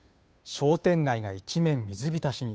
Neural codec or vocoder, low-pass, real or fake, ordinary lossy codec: codec, 16 kHz, 2 kbps, FunCodec, trained on Chinese and English, 25 frames a second; none; fake; none